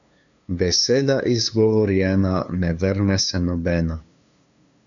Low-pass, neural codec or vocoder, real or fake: 7.2 kHz; codec, 16 kHz, 2 kbps, FunCodec, trained on LibriTTS, 25 frames a second; fake